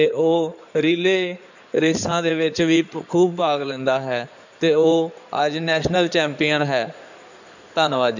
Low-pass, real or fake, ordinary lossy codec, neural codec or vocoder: 7.2 kHz; fake; none; codec, 16 kHz in and 24 kHz out, 2.2 kbps, FireRedTTS-2 codec